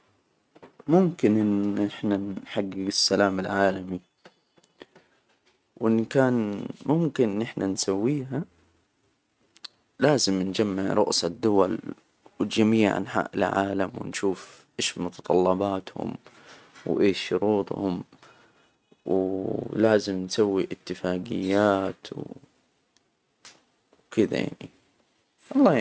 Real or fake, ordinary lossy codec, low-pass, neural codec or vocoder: real; none; none; none